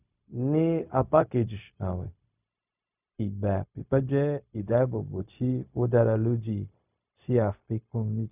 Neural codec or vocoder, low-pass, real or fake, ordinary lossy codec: codec, 16 kHz, 0.4 kbps, LongCat-Audio-Codec; 3.6 kHz; fake; none